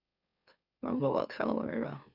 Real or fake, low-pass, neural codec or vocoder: fake; 5.4 kHz; autoencoder, 44.1 kHz, a latent of 192 numbers a frame, MeloTTS